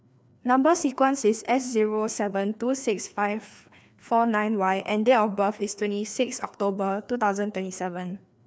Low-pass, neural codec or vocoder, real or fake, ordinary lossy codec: none; codec, 16 kHz, 2 kbps, FreqCodec, larger model; fake; none